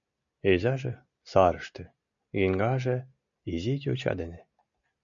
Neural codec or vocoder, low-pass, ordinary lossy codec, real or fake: none; 7.2 kHz; MP3, 64 kbps; real